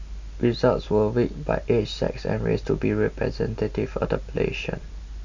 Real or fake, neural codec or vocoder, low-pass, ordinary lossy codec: real; none; 7.2 kHz; none